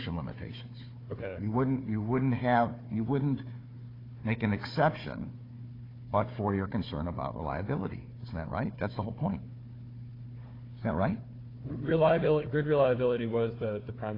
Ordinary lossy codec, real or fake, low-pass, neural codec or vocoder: AAC, 24 kbps; fake; 5.4 kHz; codec, 16 kHz, 4 kbps, FunCodec, trained on Chinese and English, 50 frames a second